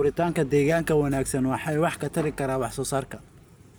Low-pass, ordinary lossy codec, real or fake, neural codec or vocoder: none; none; real; none